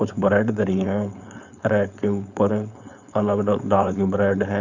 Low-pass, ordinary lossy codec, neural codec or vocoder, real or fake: 7.2 kHz; none; codec, 16 kHz, 4.8 kbps, FACodec; fake